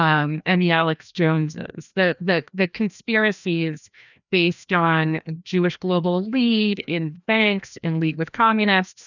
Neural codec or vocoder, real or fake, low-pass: codec, 16 kHz, 1 kbps, FreqCodec, larger model; fake; 7.2 kHz